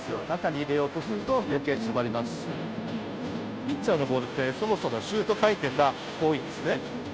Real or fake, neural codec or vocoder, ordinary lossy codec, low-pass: fake; codec, 16 kHz, 0.5 kbps, FunCodec, trained on Chinese and English, 25 frames a second; none; none